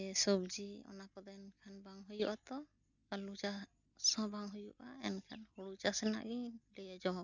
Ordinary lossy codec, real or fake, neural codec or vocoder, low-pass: none; real; none; 7.2 kHz